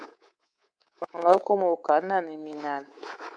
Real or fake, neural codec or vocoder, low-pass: fake; codec, 24 kHz, 3.1 kbps, DualCodec; 9.9 kHz